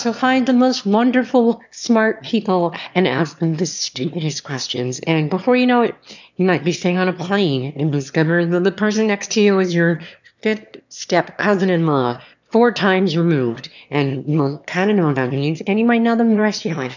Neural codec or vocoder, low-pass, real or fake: autoencoder, 22.05 kHz, a latent of 192 numbers a frame, VITS, trained on one speaker; 7.2 kHz; fake